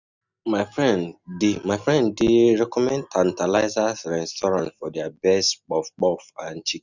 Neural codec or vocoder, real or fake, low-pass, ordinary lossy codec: none; real; 7.2 kHz; none